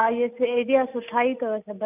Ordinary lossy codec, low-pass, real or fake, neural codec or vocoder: none; 3.6 kHz; real; none